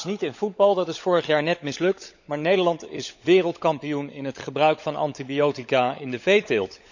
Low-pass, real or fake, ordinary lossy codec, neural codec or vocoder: 7.2 kHz; fake; none; codec, 16 kHz, 16 kbps, FunCodec, trained on LibriTTS, 50 frames a second